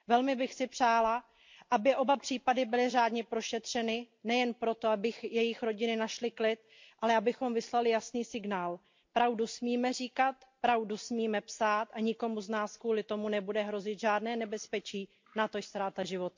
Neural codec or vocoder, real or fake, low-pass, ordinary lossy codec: none; real; 7.2 kHz; MP3, 48 kbps